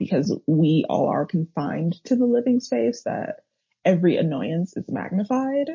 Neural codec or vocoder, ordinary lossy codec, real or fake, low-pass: none; MP3, 32 kbps; real; 7.2 kHz